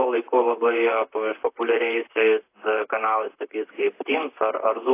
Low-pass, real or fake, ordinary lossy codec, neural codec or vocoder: 3.6 kHz; fake; AAC, 24 kbps; vocoder, 44.1 kHz, 128 mel bands, Pupu-Vocoder